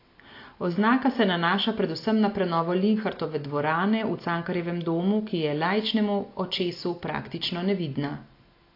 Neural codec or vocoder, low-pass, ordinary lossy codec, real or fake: none; 5.4 kHz; AAC, 32 kbps; real